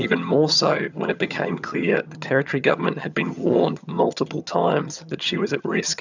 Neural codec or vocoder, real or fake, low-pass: vocoder, 22.05 kHz, 80 mel bands, HiFi-GAN; fake; 7.2 kHz